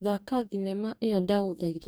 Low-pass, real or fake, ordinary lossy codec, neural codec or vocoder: none; fake; none; codec, 44.1 kHz, 2.6 kbps, DAC